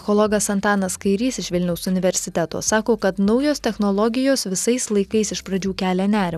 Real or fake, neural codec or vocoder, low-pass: real; none; 14.4 kHz